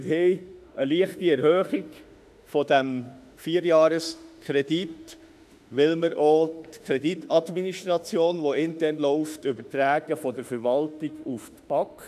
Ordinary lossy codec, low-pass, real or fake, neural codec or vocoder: none; 14.4 kHz; fake; autoencoder, 48 kHz, 32 numbers a frame, DAC-VAE, trained on Japanese speech